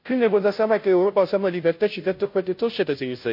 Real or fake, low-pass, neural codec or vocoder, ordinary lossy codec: fake; 5.4 kHz; codec, 16 kHz, 0.5 kbps, FunCodec, trained on Chinese and English, 25 frames a second; MP3, 32 kbps